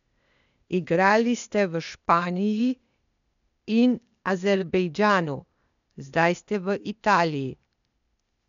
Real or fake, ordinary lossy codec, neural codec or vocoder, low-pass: fake; none; codec, 16 kHz, 0.8 kbps, ZipCodec; 7.2 kHz